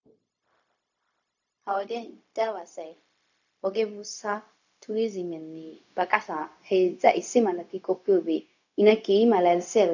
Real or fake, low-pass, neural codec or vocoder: fake; 7.2 kHz; codec, 16 kHz, 0.4 kbps, LongCat-Audio-Codec